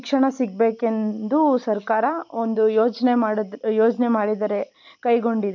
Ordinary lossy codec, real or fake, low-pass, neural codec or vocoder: MP3, 64 kbps; real; 7.2 kHz; none